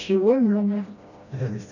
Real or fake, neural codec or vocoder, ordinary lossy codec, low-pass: fake; codec, 16 kHz, 1 kbps, FreqCodec, smaller model; none; 7.2 kHz